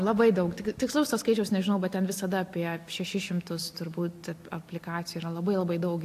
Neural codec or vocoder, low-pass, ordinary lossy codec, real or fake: none; 14.4 kHz; AAC, 64 kbps; real